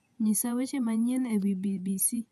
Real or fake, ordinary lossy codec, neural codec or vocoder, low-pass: fake; none; vocoder, 48 kHz, 128 mel bands, Vocos; 14.4 kHz